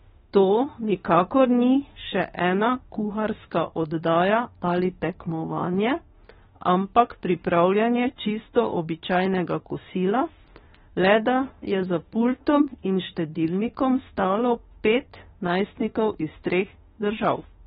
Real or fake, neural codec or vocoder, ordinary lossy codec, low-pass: fake; autoencoder, 48 kHz, 32 numbers a frame, DAC-VAE, trained on Japanese speech; AAC, 16 kbps; 19.8 kHz